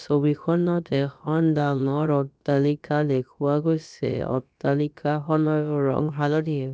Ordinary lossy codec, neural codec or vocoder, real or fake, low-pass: none; codec, 16 kHz, about 1 kbps, DyCAST, with the encoder's durations; fake; none